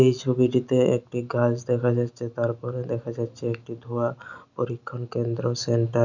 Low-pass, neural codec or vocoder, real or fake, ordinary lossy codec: 7.2 kHz; none; real; none